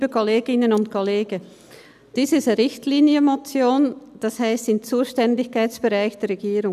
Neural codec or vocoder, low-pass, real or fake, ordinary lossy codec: none; 14.4 kHz; real; none